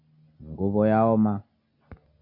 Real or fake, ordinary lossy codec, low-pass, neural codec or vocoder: real; AAC, 32 kbps; 5.4 kHz; none